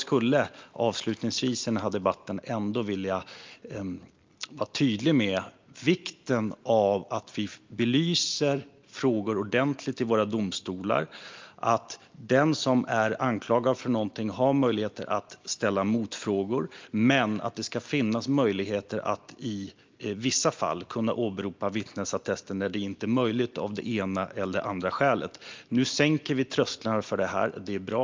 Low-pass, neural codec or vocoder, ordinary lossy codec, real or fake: 7.2 kHz; none; Opus, 24 kbps; real